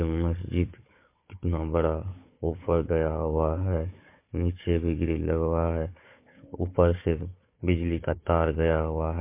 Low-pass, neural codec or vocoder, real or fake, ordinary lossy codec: 3.6 kHz; codec, 16 kHz, 4 kbps, FunCodec, trained on Chinese and English, 50 frames a second; fake; MP3, 24 kbps